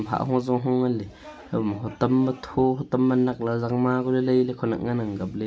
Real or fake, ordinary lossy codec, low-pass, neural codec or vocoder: real; none; none; none